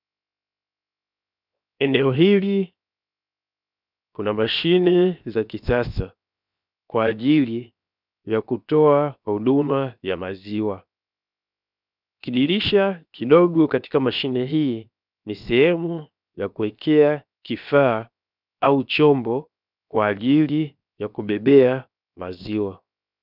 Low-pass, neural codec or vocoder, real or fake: 5.4 kHz; codec, 16 kHz, 0.7 kbps, FocalCodec; fake